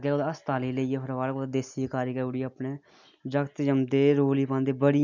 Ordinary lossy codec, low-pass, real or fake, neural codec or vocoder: none; 7.2 kHz; real; none